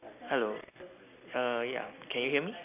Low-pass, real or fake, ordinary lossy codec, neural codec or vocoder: 3.6 kHz; real; AAC, 24 kbps; none